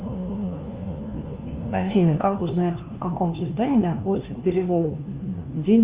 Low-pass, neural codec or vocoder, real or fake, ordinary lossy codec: 3.6 kHz; codec, 16 kHz, 1 kbps, FunCodec, trained on LibriTTS, 50 frames a second; fake; Opus, 24 kbps